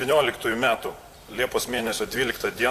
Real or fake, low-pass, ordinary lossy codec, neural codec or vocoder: fake; 14.4 kHz; AAC, 64 kbps; vocoder, 44.1 kHz, 128 mel bands, Pupu-Vocoder